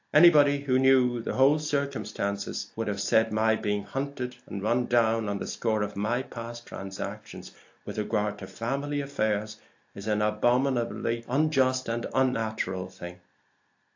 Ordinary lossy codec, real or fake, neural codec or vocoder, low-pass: AAC, 48 kbps; real; none; 7.2 kHz